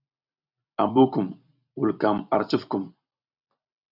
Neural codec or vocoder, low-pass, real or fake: vocoder, 44.1 kHz, 128 mel bands every 256 samples, BigVGAN v2; 5.4 kHz; fake